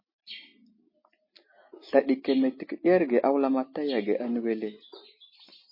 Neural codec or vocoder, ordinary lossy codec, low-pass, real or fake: none; MP3, 24 kbps; 5.4 kHz; real